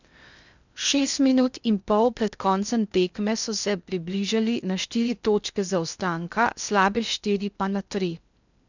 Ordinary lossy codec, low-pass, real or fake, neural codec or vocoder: none; 7.2 kHz; fake; codec, 16 kHz in and 24 kHz out, 0.6 kbps, FocalCodec, streaming, 2048 codes